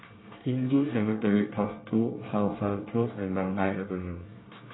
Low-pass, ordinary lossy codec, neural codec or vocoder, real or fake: 7.2 kHz; AAC, 16 kbps; codec, 24 kHz, 1 kbps, SNAC; fake